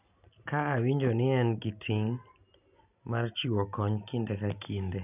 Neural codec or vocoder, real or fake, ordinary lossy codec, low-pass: none; real; none; 3.6 kHz